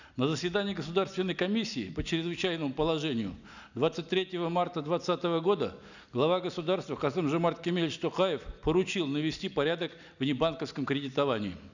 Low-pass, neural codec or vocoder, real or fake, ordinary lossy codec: 7.2 kHz; none; real; none